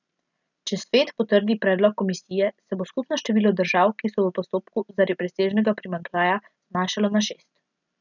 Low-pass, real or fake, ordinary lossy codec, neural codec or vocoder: 7.2 kHz; real; none; none